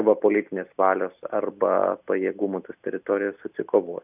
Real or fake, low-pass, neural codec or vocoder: real; 3.6 kHz; none